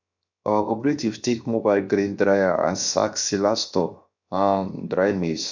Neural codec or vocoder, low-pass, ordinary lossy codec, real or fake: codec, 16 kHz, 0.7 kbps, FocalCodec; 7.2 kHz; none; fake